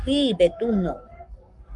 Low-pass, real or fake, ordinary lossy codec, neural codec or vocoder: 10.8 kHz; fake; Opus, 32 kbps; codec, 44.1 kHz, 7.8 kbps, Pupu-Codec